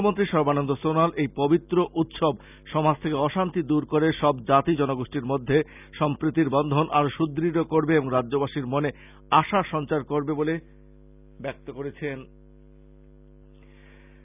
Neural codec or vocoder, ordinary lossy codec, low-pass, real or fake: none; none; 3.6 kHz; real